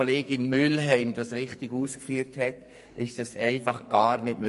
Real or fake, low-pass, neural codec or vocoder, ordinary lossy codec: fake; 14.4 kHz; codec, 44.1 kHz, 2.6 kbps, SNAC; MP3, 48 kbps